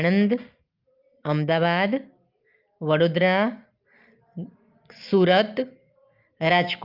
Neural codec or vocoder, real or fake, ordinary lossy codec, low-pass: none; real; Opus, 24 kbps; 5.4 kHz